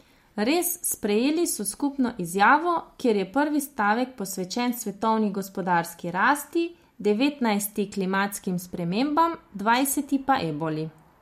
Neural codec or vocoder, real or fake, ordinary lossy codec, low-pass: none; real; MP3, 64 kbps; 19.8 kHz